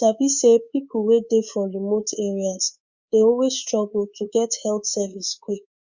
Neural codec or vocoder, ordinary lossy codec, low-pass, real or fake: codec, 24 kHz, 3.1 kbps, DualCodec; Opus, 64 kbps; 7.2 kHz; fake